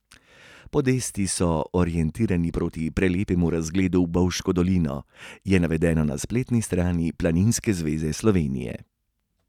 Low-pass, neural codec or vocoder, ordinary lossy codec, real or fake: 19.8 kHz; none; none; real